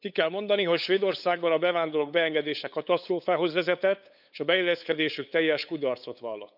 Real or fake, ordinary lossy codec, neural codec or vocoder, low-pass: fake; none; codec, 16 kHz, 8 kbps, FunCodec, trained on LibriTTS, 25 frames a second; 5.4 kHz